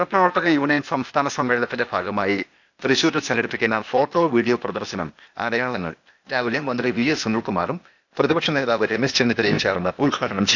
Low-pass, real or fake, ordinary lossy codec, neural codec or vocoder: 7.2 kHz; fake; none; codec, 16 kHz, 0.8 kbps, ZipCodec